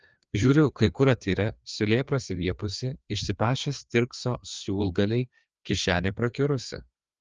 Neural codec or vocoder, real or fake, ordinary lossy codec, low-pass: codec, 16 kHz, 2 kbps, FreqCodec, larger model; fake; Opus, 24 kbps; 7.2 kHz